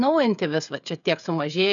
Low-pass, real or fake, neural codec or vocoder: 7.2 kHz; fake; codec, 16 kHz, 16 kbps, FreqCodec, smaller model